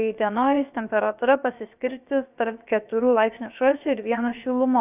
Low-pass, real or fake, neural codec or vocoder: 3.6 kHz; fake; codec, 16 kHz, about 1 kbps, DyCAST, with the encoder's durations